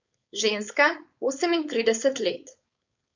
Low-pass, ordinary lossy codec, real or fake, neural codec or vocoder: 7.2 kHz; none; fake; codec, 16 kHz, 4.8 kbps, FACodec